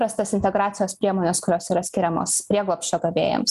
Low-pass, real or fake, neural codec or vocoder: 14.4 kHz; real; none